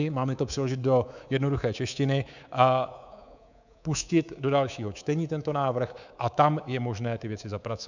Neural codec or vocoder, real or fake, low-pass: codec, 24 kHz, 3.1 kbps, DualCodec; fake; 7.2 kHz